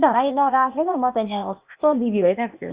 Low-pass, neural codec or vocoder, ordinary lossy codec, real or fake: 3.6 kHz; codec, 16 kHz, 0.8 kbps, ZipCodec; Opus, 64 kbps; fake